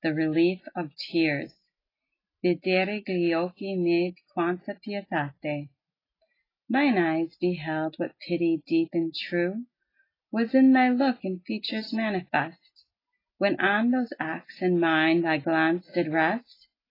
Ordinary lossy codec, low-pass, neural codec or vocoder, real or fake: AAC, 24 kbps; 5.4 kHz; none; real